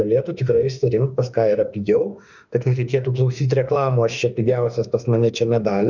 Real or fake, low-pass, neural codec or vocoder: fake; 7.2 kHz; autoencoder, 48 kHz, 32 numbers a frame, DAC-VAE, trained on Japanese speech